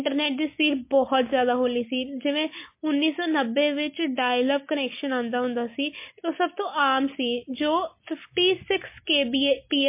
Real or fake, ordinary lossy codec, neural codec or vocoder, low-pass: real; MP3, 24 kbps; none; 3.6 kHz